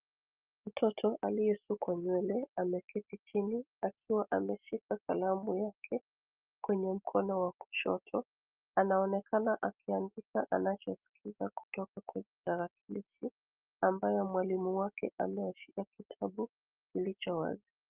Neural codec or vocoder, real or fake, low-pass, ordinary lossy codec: none; real; 3.6 kHz; Opus, 24 kbps